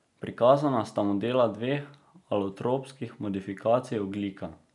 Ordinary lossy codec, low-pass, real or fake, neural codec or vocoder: none; 10.8 kHz; real; none